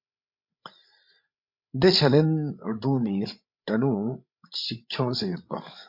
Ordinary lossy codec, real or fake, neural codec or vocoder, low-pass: MP3, 48 kbps; fake; codec, 16 kHz, 16 kbps, FreqCodec, larger model; 5.4 kHz